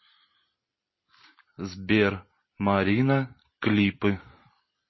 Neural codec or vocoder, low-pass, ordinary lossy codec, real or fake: none; 7.2 kHz; MP3, 24 kbps; real